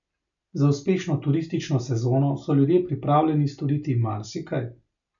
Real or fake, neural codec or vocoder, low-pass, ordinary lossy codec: real; none; 7.2 kHz; none